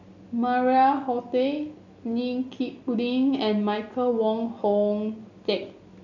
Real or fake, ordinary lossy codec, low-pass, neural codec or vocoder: real; none; 7.2 kHz; none